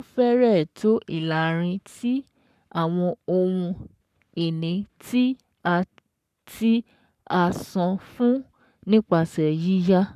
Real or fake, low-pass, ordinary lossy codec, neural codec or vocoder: fake; 14.4 kHz; none; codec, 44.1 kHz, 7.8 kbps, Pupu-Codec